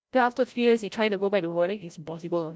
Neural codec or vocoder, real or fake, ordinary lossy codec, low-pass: codec, 16 kHz, 0.5 kbps, FreqCodec, larger model; fake; none; none